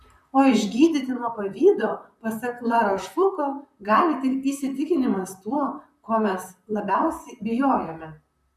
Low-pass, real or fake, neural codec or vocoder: 14.4 kHz; fake; vocoder, 44.1 kHz, 128 mel bands, Pupu-Vocoder